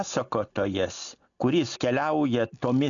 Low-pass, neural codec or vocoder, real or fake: 7.2 kHz; none; real